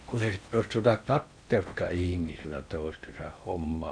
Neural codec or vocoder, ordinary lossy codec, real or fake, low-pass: codec, 16 kHz in and 24 kHz out, 0.8 kbps, FocalCodec, streaming, 65536 codes; none; fake; 9.9 kHz